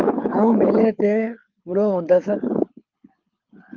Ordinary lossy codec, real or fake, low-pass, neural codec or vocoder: Opus, 24 kbps; fake; 7.2 kHz; codec, 24 kHz, 6 kbps, HILCodec